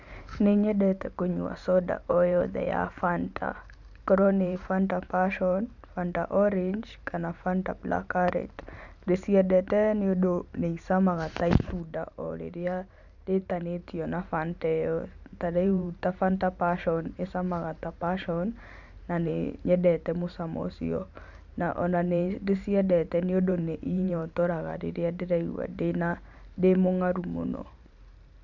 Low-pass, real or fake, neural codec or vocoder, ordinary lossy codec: 7.2 kHz; fake; vocoder, 44.1 kHz, 128 mel bands every 512 samples, BigVGAN v2; none